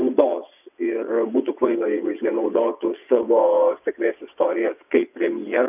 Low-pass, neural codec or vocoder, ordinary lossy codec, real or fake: 3.6 kHz; vocoder, 22.05 kHz, 80 mel bands, WaveNeXt; AAC, 32 kbps; fake